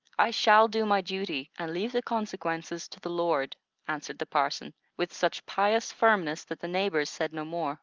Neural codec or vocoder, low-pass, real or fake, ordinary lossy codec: none; 7.2 kHz; real; Opus, 24 kbps